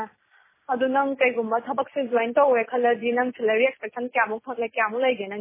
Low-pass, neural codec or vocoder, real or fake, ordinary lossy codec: 3.6 kHz; none; real; MP3, 16 kbps